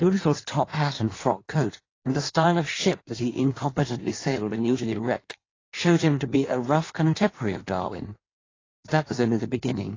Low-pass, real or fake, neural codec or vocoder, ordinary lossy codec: 7.2 kHz; fake; codec, 16 kHz in and 24 kHz out, 1.1 kbps, FireRedTTS-2 codec; AAC, 32 kbps